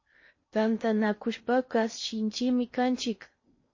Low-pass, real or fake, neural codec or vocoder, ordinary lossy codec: 7.2 kHz; fake; codec, 16 kHz in and 24 kHz out, 0.6 kbps, FocalCodec, streaming, 2048 codes; MP3, 32 kbps